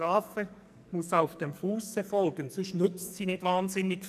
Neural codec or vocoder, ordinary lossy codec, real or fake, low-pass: codec, 44.1 kHz, 2.6 kbps, SNAC; none; fake; 14.4 kHz